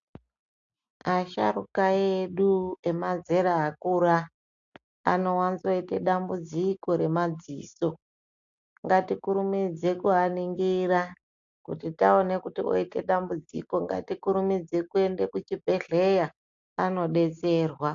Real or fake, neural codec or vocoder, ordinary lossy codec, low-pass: real; none; AAC, 64 kbps; 7.2 kHz